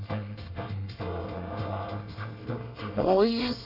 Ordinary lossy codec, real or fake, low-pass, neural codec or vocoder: none; fake; 5.4 kHz; codec, 24 kHz, 1 kbps, SNAC